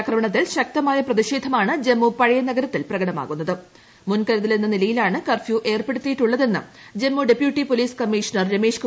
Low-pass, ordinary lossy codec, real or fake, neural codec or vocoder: none; none; real; none